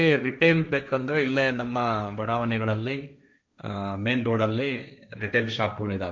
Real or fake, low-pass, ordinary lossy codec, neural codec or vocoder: fake; none; none; codec, 16 kHz, 1.1 kbps, Voila-Tokenizer